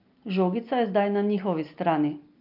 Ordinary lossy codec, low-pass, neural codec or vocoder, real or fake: Opus, 24 kbps; 5.4 kHz; none; real